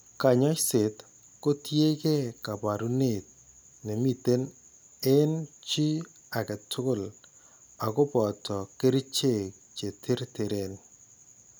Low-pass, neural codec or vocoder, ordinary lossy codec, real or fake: none; none; none; real